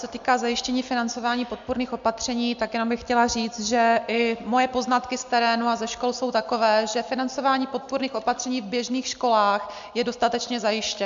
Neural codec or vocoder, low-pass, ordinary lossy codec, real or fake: none; 7.2 kHz; MP3, 64 kbps; real